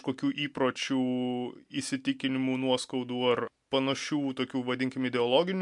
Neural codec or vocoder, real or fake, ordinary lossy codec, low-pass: none; real; MP3, 64 kbps; 10.8 kHz